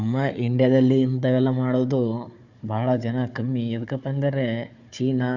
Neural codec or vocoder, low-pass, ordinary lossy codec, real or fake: codec, 16 kHz, 4 kbps, FunCodec, trained on Chinese and English, 50 frames a second; 7.2 kHz; Opus, 64 kbps; fake